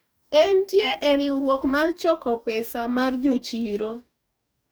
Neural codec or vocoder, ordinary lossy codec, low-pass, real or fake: codec, 44.1 kHz, 2.6 kbps, DAC; none; none; fake